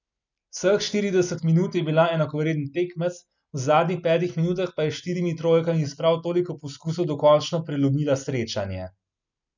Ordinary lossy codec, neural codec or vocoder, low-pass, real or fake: none; none; 7.2 kHz; real